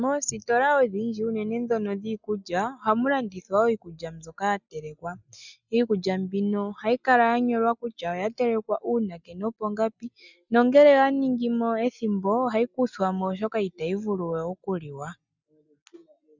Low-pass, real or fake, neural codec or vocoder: 7.2 kHz; real; none